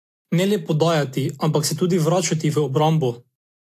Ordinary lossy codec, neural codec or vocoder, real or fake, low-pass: AAC, 64 kbps; none; real; 14.4 kHz